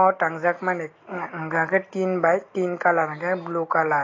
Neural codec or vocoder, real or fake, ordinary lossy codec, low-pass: none; real; AAC, 32 kbps; 7.2 kHz